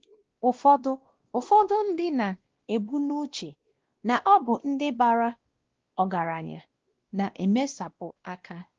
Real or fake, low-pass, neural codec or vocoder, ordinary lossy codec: fake; 7.2 kHz; codec, 16 kHz, 1 kbps, X-Codec, WavLM features, trained on Multilingual LibriSpeech; Opus, 16 kbps